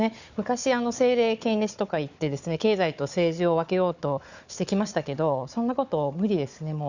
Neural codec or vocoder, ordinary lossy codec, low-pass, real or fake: codec, 16 kHz, 4 kbps, FunCodec, trained on Chinese and English, 50 frames a second; none; 7.2 kHz; fake